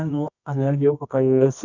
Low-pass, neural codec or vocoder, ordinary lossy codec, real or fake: 7.2 kHz; codec, 24 kHz, 0.9 kbps, WavTokenizer, medium music audio release; none; fake